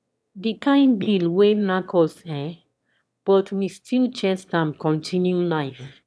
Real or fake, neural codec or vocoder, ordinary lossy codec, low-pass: fake; autoencoder, 22.05 kHz, a latent of 192 numbers a frame, VITS, trained on one speaker; none; none